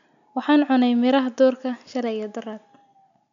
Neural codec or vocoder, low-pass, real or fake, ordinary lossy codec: none; 7.2 kHz; real; none